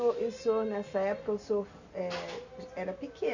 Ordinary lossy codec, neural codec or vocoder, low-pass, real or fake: none; none; 7.2 kHz; real